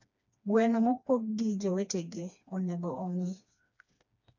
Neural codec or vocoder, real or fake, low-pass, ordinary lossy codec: codec, 16 kHz, 2 kbps, FreqCodec, smaller model; fake; 7.2 kHz; none